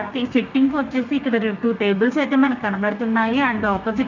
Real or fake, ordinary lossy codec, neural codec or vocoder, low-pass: fake; none; codec, 32 kHz, 1.9 kbps, SNAC; 7.2 kHz